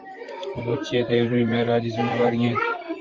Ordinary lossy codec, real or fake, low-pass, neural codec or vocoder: Opus, 24 kbps; fake; 7.2 kHz; vocoder, 44.1 kHz, 128 mel bands, Pupu-Vocoder